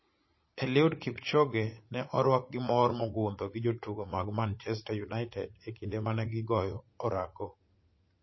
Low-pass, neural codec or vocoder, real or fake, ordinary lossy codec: 7.2 kHz; vocoder, 44.1 kHz, 80 mel bands, Vocos; fake; MP3, 24 kbps